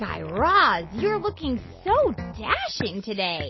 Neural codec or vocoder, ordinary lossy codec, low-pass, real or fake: none; MP3, 24 kbps; 7.2 kHz; real